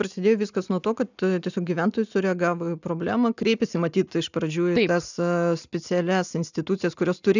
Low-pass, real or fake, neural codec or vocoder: 7.2 kHz; real; none